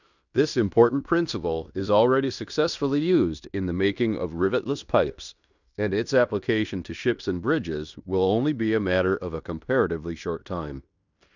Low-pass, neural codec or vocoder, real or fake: 7.2 kHz; codec, 16 kHz in and 24 kHz out, 0.9 kbps, LongCat-Audio-Codec, fine tuned four codebook decoder; fake